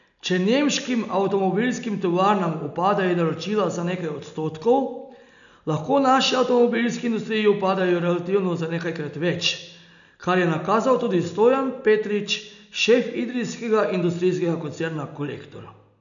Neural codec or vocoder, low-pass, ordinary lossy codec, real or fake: none; 7.2 kHz; none; real